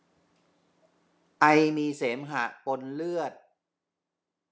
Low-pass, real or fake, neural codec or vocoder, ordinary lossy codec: none; real; none; none